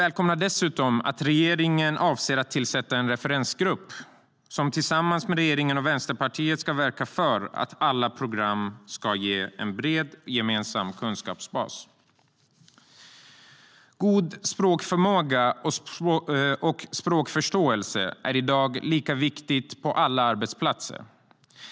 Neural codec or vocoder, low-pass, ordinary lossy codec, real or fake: none; none; none; real